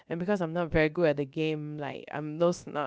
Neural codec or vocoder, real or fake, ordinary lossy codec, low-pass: codec, 16 kHz, about 1 kbps, DyCAST, with the encoder's durations; fake; none; none